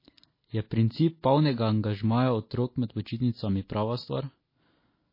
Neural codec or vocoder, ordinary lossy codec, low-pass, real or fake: none; MP3, 24 kbps; 5.4 kHz; real